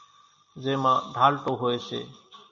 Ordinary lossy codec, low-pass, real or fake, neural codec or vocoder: AAC, 64 kbps; 7.2 kHz; real; none